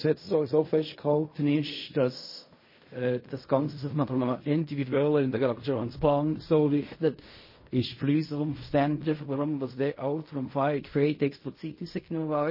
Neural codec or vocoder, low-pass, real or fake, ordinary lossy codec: codec, 16 kHz in and 24 kHz out, 0.4 kbps, LongCat-Audio-Codec, fine tuned four codebook decoder; 5.4 kHz; fake; MP3, 24 kbps